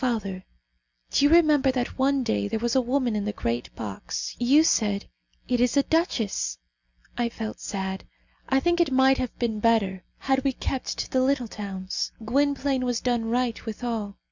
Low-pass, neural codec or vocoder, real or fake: 7.2 kHz; none; real